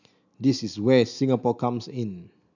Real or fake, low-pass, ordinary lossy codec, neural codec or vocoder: real; 7.2 kHz; none; none